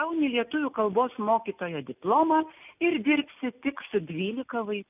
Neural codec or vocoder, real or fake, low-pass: none; real; 3.6 kHz